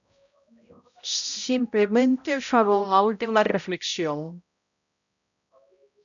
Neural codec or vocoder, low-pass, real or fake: codec, 16 kHz, 0.5 kbps, X-Codec, HuBERT features, trained on balanced general audio; 7.2 kHz; fake